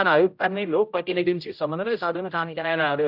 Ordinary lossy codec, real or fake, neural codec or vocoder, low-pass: none; fake; codec, 16 kHz, 0.5 kbps, X-Codec, HuBERT features, trained on general audio; 5.4 kHz